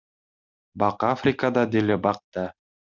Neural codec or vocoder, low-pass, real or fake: none; 7.2 kHz; real